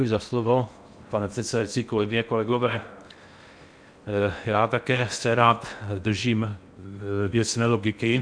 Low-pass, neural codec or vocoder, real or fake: 9.9 kHz; codec, 16 kHz in and 24 kHz out, 0.6 kbps, FocalCodec, streaming, 4096 codes; fake